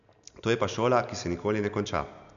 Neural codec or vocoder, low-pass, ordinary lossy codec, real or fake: none; 7.2 kHz; none; real